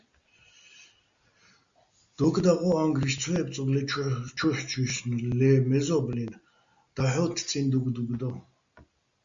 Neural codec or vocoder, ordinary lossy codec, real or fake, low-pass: none; Opus, 64 kbps; real; 7.2 kHz